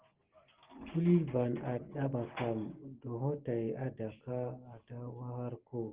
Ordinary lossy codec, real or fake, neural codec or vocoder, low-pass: Opus, 16 kbps; real; none; 3.6 kHz